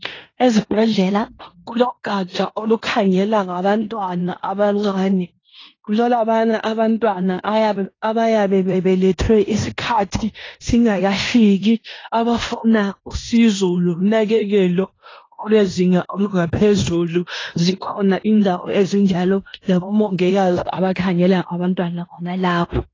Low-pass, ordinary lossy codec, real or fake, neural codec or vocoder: 7.2 kHz; AAC, 32 kbps; fake; codec, 16 kHz in and 24 kHz out, 0.9 kbps, LongCat-Audio-Codec, fine tuned four codebook decoder